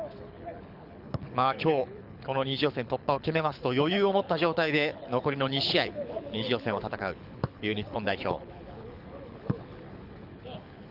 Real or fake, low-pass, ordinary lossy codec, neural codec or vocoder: fake; 5.4 kHz; none; codec, 24 kHz, 6 kbps, HILCodec